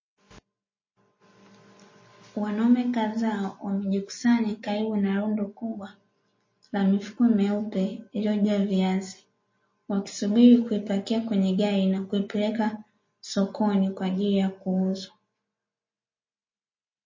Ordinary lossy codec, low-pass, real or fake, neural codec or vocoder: MP3, 32 kbps; 7.2 kHz; real; none